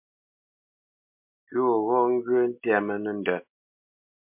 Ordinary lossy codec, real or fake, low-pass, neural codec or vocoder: MP3, 32 kbps; real; 3.6 kHz; none